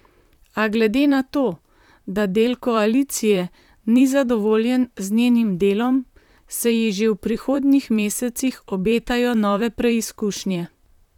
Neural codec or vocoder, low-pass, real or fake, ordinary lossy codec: vocoder, 44.1 kHz, 128 mel bands, Pupu-Vocoder; 19.8 kHz; fake; none